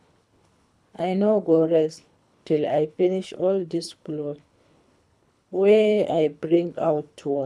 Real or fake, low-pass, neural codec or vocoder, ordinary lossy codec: fake; none; codec, 24 kHz, 3 kbps, HILCodec; none